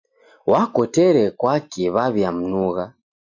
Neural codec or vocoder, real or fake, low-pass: none; real; 7.2 kHz